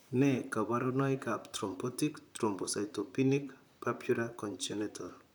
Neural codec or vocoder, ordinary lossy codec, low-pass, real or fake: vocoder, 44.1 kHz, 128 mel bands, Pupu-Vocoder; none; none; fake